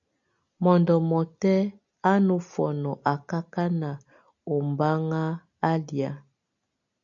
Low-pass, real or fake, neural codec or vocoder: 7.2 kHz; real; none